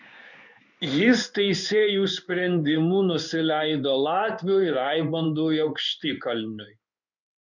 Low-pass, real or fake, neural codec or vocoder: 7.2 kHz; fake; codec, 16 kHz in and 24 kHz out, 1 kbps, XY-Tokenizer